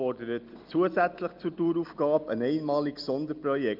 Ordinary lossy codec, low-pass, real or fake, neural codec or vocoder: Opus, 24 kbps; 5.4 kHz; real; none